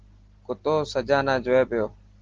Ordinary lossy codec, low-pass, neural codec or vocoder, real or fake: Opus, 16 kbps; 7.2 kHz; none; real